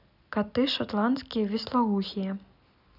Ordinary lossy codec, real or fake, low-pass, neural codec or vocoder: none; real; 5.4 kHz; none